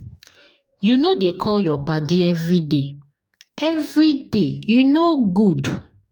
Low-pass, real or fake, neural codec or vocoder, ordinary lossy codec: 19.8 kHz; fake; codec, 44.1 kHz, 2.6 kbps, DAC; none